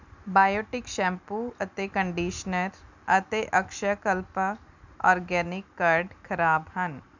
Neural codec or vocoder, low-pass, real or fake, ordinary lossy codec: none; 7.2 kHz; real; none